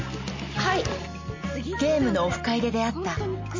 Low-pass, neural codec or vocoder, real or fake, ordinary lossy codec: 7.2 kHz; none; real; MP3, 32 kbps